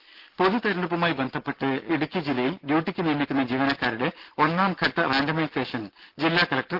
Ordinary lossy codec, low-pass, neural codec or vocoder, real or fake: Opus, 16 kbps; 5.4 kHz; none; real